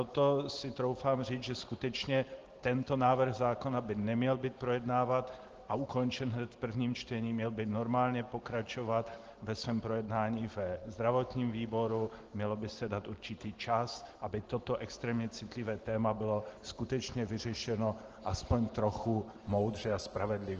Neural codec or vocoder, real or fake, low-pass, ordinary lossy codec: none; real; 7.2 kHz; Opus, 16 kbps